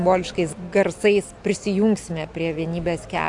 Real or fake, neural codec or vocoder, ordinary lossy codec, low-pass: real; none; AAC, 64 kbps; 10.8 kHz